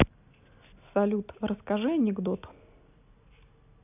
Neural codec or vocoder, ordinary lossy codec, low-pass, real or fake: none; none; 3.6 kHz; real